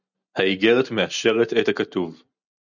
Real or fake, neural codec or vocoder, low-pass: real; none; 7.2 kHz